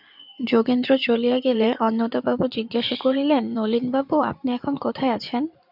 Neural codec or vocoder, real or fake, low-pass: codec, 16 kHz in and 24 kHz out, 2.2 kbps, FireRedTTS-2 codec; fake; 5.4 kHz